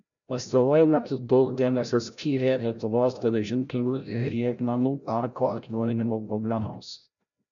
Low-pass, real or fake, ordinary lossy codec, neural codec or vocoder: 7.2 kHz; fake; MP3, 96 kbps; codec, 16 kHz, 0.5 kbps, FreqCodec, larger model